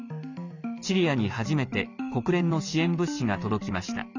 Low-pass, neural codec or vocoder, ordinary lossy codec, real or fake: 7.2 kHz; none; none; real